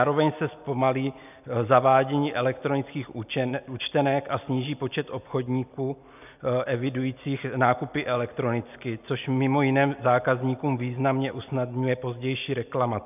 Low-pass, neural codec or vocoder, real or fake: 3.6 kHz; none; real